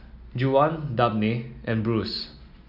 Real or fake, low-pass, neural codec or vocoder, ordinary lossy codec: real; 5.4 kHz; none; AAC, 48 kbps